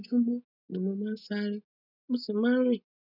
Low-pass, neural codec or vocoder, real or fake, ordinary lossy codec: 5.4 kHz; none; real; none